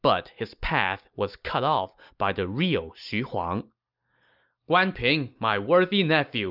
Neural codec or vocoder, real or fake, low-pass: none; real; 5.4 kHz